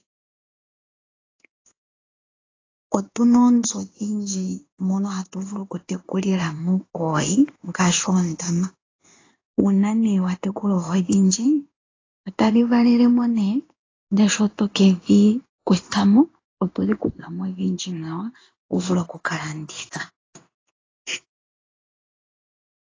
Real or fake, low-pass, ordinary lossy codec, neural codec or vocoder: fake; 7.2 kHz; AAC, 32 kbps; codec, 16 kHz in and 24 kHz out, 1 kbps, XY-Tokenizer